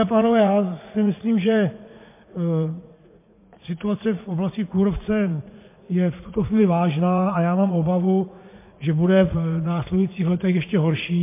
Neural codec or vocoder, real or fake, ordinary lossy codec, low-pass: vocoder, 44.1 kHz, 80 mel bands, Vocos; fake; MP3, 24 kbps; 3.6 kHz